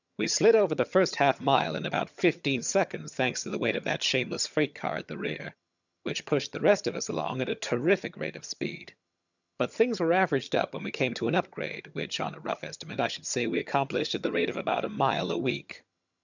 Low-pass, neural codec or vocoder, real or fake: 7.2 kHz; vocoder, 22.05 kHz, 80 mel bands, HiFi-GAN; fake